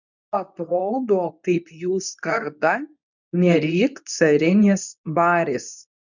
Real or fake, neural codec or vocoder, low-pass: fake; codec, 24 kHz, 0.9 kbps, WavTokenizer, medium speech release version 2; 7.2 kHz